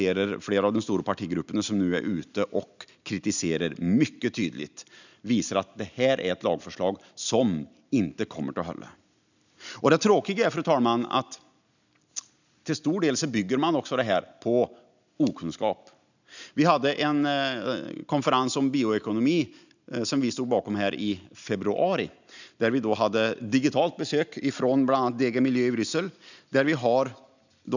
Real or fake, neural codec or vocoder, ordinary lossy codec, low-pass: real; none; none; 7.2 kHz